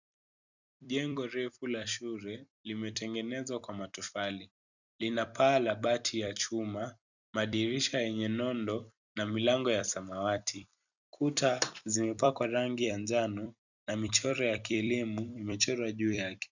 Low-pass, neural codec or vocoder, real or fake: 7.2 kHz; vocoder, 44.1 kHz, 128 mel bands every 256 samples, BigVGAN v2; fake